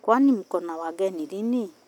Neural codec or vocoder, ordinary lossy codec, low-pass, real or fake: none; none; 19.8 kHz; real